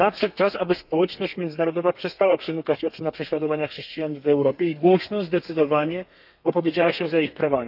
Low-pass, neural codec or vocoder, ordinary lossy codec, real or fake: 5.4 kHz; codec, 32 kHz, 1.9 kbps, SNAC; none; fake